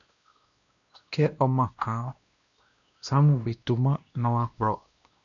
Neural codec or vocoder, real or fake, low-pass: codec, 16 kHz, 1 kbps, X-Codec, WavLM features, trained on Multilingual LibriSpeech; fake; 7.2 kHz